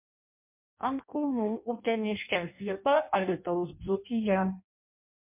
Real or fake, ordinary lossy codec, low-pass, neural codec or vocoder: fake; MP3, 32 kbps; 3.6 kHz; codec, 16 kHz in and 24 kHz out, 0.6 kbps, FireRedTTS-2 codec